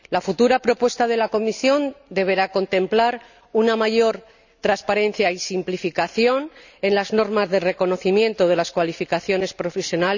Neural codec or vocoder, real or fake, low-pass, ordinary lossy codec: none; real; 7.2 kHz; none